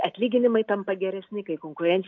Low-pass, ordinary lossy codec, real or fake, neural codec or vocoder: 7.2 kHz; AAC, 48 kbps; real; none